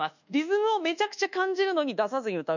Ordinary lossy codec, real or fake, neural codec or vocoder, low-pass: none; fake; codec, 24 kHz, 1.2 kbps, DualCodec; 7.2 kHz